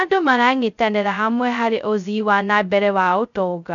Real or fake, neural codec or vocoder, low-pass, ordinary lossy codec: fake; codec, 16 kHz, 0.2 kbps, FocalCodec; 7.2 kHz; none